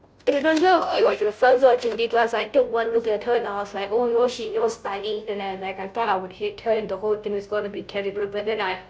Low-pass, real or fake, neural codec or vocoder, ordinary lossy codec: none; fake; codec, 16 kHz, 0.5 kbps, FunCodec, trained on Chinese and English, 25 frames a second; none